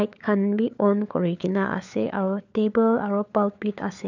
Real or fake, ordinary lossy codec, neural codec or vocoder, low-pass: fake; none; codec, 16 kHz, 4 kbps, FunCodec, trained on LibriTTS, 50 frames a second; 7.2 kHz